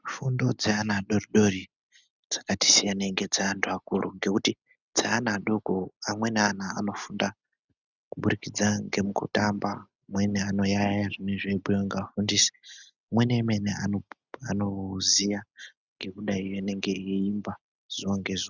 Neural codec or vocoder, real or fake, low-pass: none; real; 7.2 kHz